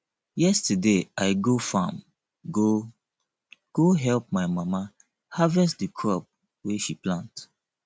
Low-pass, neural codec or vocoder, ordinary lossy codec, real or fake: none; none; none; real